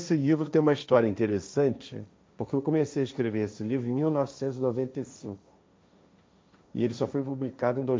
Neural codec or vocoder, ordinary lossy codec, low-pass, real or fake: codec, 16 kHz, 1.1 kbps, Voila-Tokenizer; none; none; fake